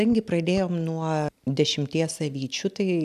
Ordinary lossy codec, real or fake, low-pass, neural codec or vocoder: AAC, 96 kbps; real; 14.4 kHz; none